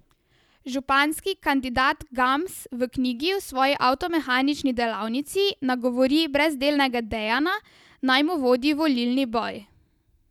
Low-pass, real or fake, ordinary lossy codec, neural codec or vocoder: 19.8 kHz; real; none; none